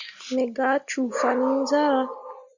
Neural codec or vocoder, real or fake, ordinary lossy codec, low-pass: none; real; Opus, 64 kbps; 7.2 kHz